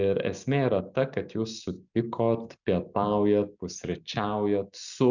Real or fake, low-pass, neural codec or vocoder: real; 7.2 kHz; none